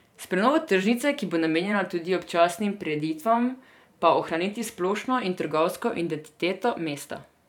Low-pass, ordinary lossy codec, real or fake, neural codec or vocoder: 19.8 kHz; none; fake; vocoder, 44.1 kHz, 128 mel bands every 512 samples, BigVGAN v2